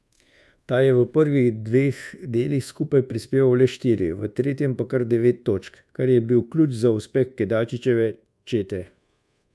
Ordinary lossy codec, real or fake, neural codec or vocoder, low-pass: none; fake; codec, 24 kHz, 1.2 kbps, DualCodec; none